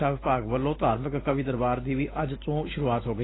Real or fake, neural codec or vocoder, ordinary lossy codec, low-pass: real; none; AAC, 16 kbps; 7.2 kHz